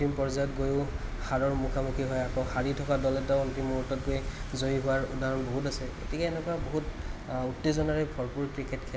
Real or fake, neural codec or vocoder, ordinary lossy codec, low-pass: real; none; none; none